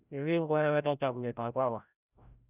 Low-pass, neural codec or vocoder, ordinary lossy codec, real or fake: 3.6 kHz; codec, 16 kHz, 0.5 kbps, FreqCodec, larger model; none; fake